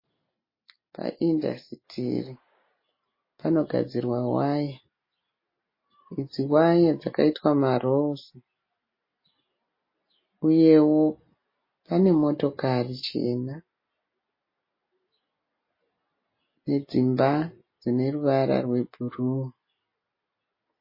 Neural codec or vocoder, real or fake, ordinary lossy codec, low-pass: none; real; MP3, 24 kbps; 5.4 kHz